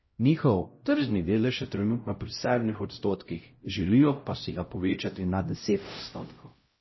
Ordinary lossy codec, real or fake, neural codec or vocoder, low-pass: MP3, 24 kbps; fake; codec, 16 kHz, 0.5 kbps, X-Codec, HuBERT features, trained on LibriSpeech; 7.2 kHz